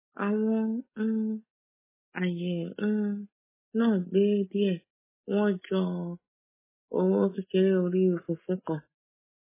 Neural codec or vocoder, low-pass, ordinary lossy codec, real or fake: none; 3.6 kHz; MP3, 16 kbps; real